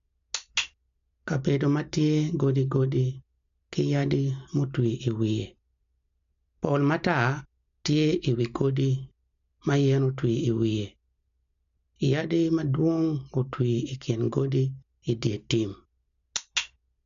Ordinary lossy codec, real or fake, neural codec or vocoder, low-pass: MP3, 64 kbps; real; none; 7.2 kHz